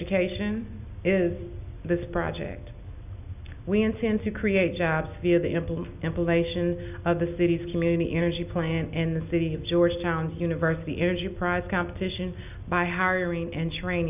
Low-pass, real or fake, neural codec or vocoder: 3.6 kHz; real; none